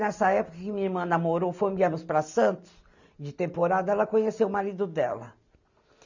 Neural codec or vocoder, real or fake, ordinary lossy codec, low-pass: none; real; none; 7.2 kHz